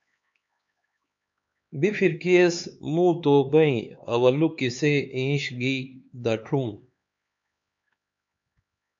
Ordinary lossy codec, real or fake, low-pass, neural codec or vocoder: AAC, 64 kbps; fake; 7.2 kHz; codec, 16 kHz, 4 kbps, X-Codec, HuBERT features, trained on LibriSpeech